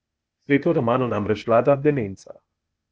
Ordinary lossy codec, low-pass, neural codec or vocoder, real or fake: none; none; codec, 16 kHz, 0.8 kbps, ZipCodec; fake